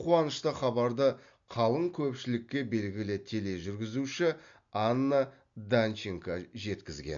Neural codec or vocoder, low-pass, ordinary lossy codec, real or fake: none; 7.2 kHz; MP3, 64 kbps; real